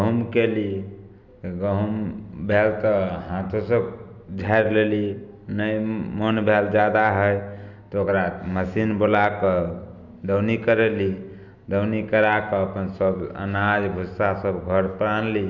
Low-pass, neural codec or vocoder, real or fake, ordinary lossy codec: 7.2 kHz; none; real; none